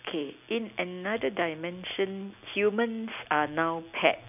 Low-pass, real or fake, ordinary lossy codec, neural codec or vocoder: 3.6 kHz; real; none; none